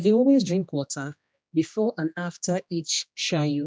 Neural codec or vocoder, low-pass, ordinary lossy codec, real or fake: codec, 16 kHz, 1 kbps, X-Codec, HuBERT features, trained on general audio; none; none; fake